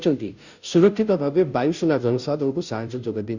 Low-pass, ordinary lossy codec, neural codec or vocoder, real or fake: 7.2 kHz; none; codec, 16 kHz, 0.5 kbps, FunCodec, trained on Chinese and English, 25 frames a second; fake